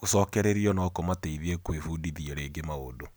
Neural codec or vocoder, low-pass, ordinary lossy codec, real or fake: none; none; none; real